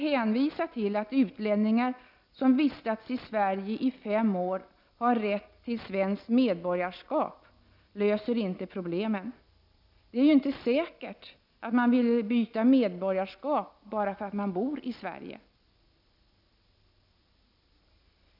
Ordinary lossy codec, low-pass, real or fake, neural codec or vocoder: none; 5.4 kHz; real; none